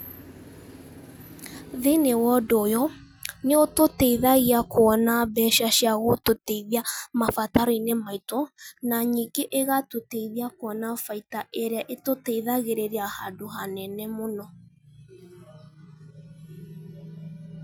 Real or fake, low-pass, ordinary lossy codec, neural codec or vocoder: real; none; none; none